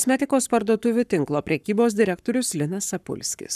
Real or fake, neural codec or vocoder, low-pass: fake; codec, 44.1 kHz, 7.8 kbps, Pupu-Codec; 14.4 kHz